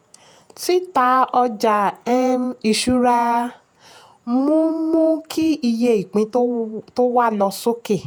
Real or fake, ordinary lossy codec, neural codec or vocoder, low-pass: fake; none; vocoder, 48 kHz, 128 mel bands, Vocos; none